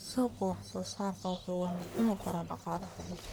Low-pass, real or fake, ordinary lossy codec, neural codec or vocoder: none; fake; none; codec, 44.1 kHz, 1.7 kbps, Pupu-Codec